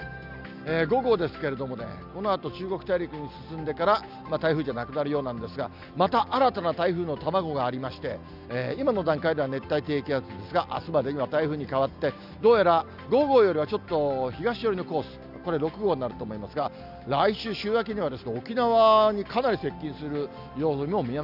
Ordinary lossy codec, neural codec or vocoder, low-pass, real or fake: none; none; 5.4 kHz; real